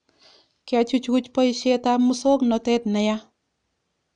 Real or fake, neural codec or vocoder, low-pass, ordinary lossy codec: real; none; 9.9 kHz; none